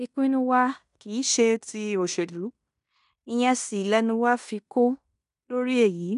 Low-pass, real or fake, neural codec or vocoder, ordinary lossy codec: 10.8 kHz; fake; codec, 16 kHz in and 24 kHz out, 0.9 kbps, LongCat-Audio-Codec, fine tuned four codebook decoder; none